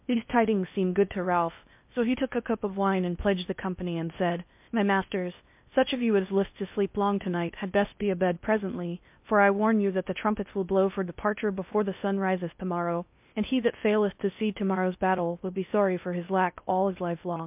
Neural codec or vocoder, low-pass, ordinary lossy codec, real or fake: codec, 16 kHz in and 24 kHz out, 0.6 kbps, FocalCodec, streaming, 4096 codes; 3.6 kHz; MP3, 32 kbps; fake